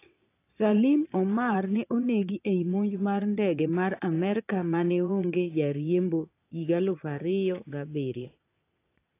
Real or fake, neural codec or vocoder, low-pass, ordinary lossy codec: fake; vocoder, 22.05 kHz, 80 mel bands, Vocos; 3.6 kHz; AAC, 24 kbps